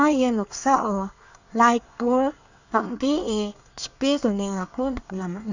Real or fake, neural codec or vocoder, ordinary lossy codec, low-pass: fake; codec, 24 kHz, 1 kbps, SNAC; none; 7.2 kHz